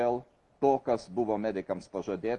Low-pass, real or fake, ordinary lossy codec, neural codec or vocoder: 10.8 kHz; real; Opus, 32 kbps; none